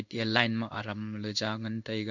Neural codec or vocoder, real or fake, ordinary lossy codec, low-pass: codec, 16 kHz in and 24 kHz out, 1 kbps, XY-Tokenizer; fake; none; 7.2 kHz